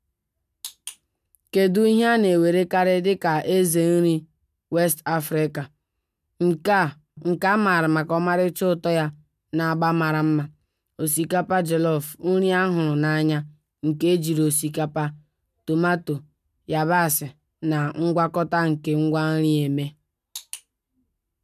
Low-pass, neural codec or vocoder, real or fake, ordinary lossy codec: 14.4 kHz; none; real; none